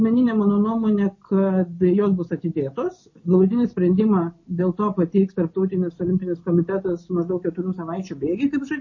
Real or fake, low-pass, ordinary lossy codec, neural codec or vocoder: real; 7.2 kHz; MP3, 32 kbps; none